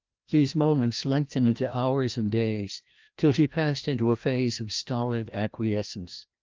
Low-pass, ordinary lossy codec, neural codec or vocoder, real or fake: 7.2 kHz; Opus, 24 kbps; codec, 16 kHz, 1 kbps, FreqCodec, larger model; fake